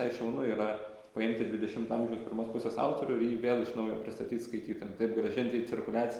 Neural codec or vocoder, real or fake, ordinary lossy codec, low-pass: none; real; Opus, 24 kbps; 19.8 kHz